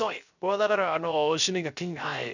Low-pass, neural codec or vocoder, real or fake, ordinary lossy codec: 7.2 kHz; codec, 16 kHz, 0.3 kbps, FocalCodec; fake; none